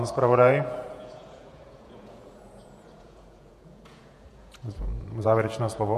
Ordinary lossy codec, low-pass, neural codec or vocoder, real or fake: MP3, 64 kbps; 14.4 kHz; vocoder, 48 kHz, 128 mel bands, Vocos; fake